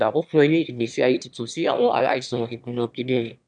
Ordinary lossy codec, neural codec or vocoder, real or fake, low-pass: none; autoencoder, 22.05 kHz, a latent of 192 numbers a frame, VITS, trained on one speaker; fake; 9.9 kHz